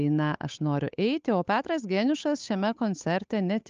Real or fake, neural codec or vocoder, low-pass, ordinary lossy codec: fake; codec, 16 kHz, 8 kbps, FunCodec, trained on Chinese and English, 25 frames a second; 7.2 kHz; Opus, 24 kbps